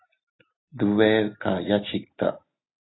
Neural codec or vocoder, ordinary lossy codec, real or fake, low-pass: none; AAC, 16 kbps; real; 7.2 kHz